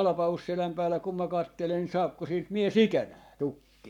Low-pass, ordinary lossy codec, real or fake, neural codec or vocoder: 19.8 kHz; none; fake; codec, 44.1 kHz, 7.8 kbps, Pupu-Codec